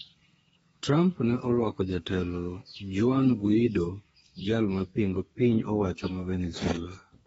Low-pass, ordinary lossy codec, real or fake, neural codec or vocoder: 14.4 kHz; AAC, 24 kbps; fake; codec, 32 kHz, 1.9 kbps, SNAC